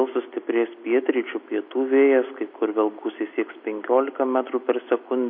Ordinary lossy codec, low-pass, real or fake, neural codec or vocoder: MP3, 32 kbps; 5.4 kHz; real; none